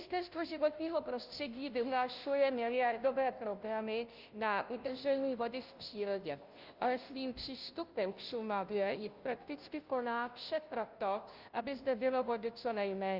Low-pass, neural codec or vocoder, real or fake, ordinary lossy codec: 5.4 kHz; codec, 16 kHz, 0.5 kbps, FunCodec, trained on Chinese and English, 25 frames a second; fake; Opus, 24 kbps